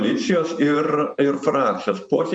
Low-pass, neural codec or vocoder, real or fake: 9.9 kHz; vocoder, 24 kHz, 100 mel bands, Vocos; fake